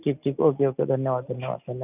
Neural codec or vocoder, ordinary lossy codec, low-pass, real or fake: none; none; 3.6 kHz; real